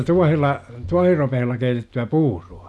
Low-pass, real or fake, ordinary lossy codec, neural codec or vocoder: none; real; none; none